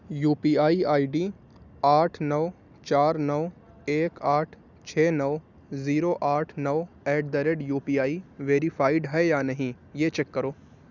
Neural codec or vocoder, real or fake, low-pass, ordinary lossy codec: none; real; 7.2 kHz; none